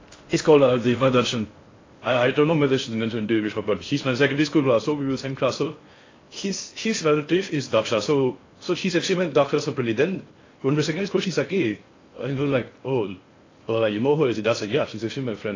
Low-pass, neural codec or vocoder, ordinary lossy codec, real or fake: 7.2 kHz; codec, 16 kHz in and 24 kHz out, 0.6 kbps, FocalCodec, streaming, 4096 codes; AAC, 32 kbps; fake